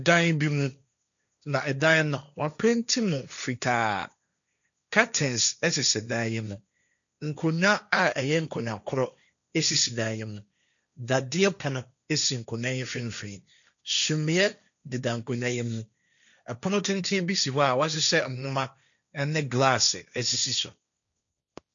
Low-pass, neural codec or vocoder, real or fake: 7.2 kHz; codec, 16 kHz, 1.1 kbps, Voila-Tokenizer; fake